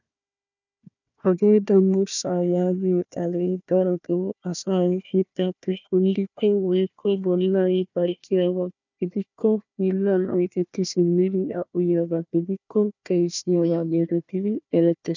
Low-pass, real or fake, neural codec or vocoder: 7.2 kHz; fake; codec, 16 kHz, 1 kbps, FunCodec, trained on Chinese and English, 50 frames a second